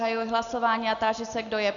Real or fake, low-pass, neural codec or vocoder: real; 7.2 kHz; none